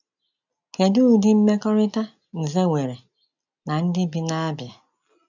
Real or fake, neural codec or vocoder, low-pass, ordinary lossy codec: real; none; 7.2 kHz; none